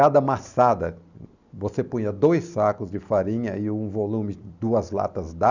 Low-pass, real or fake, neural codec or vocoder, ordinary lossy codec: 7.2 kHz; real; none; none